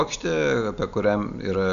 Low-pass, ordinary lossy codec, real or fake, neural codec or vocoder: 7.2 kHz; MP3, 96 kbps; real; none